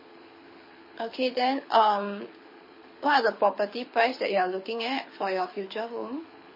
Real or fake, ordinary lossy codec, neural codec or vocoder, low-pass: fake; MP3, 24 kbps; codec, 24 kHz, 6 kbps, HILCodec; 5.4 kHz